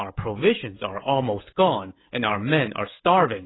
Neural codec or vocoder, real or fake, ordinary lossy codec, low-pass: codec, 16 kHz, 16 kbps, FreqCodec, smaller model; fake; AAC, 16 kbps; 7.2 kHz